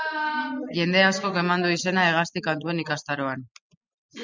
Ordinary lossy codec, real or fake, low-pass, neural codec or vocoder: MP3, 64 kbps; real; 7.2 kHz; none